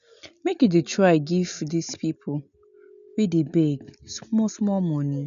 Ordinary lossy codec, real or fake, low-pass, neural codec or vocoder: none; real; 7.2 kHz; none